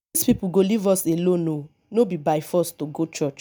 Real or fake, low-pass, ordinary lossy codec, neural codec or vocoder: real; none; none; none